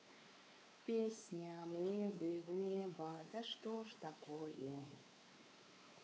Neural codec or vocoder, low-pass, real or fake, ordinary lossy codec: codec, 16 kHz, 4 kbps, X-Codec, WavLM features, trained on Multilingual LibriSpeech; none; fake; none